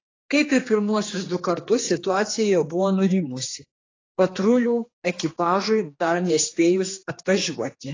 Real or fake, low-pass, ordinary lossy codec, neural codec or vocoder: fake; 7.2 kHz; AAC, 32 kbps; codec, 16 kHz, 2 kbps, X-Codec, HuBERT features, trained on general audio